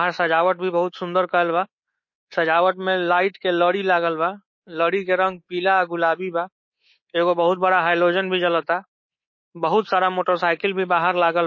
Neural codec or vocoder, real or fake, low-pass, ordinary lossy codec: codec, 24 kHz, 3.1 kbps, DualCodec; fake; 7.2 kHz; MP3, 32 kbps